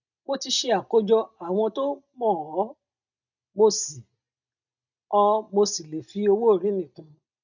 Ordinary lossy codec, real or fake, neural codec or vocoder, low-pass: none; real; none; 7.2 kHz